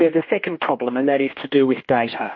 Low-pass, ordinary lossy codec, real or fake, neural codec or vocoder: 7.2 kHz; MP3, 32 kbps; fake; codec, 16 kHz, 1 kbps, X-Codec, HuBERT features, trained on general audio